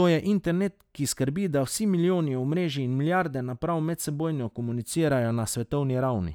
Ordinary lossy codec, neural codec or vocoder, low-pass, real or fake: none; none; 19.8 kHz; real